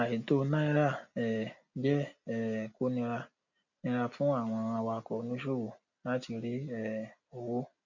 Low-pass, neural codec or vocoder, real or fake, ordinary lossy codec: 7.2 kHz; none; real; none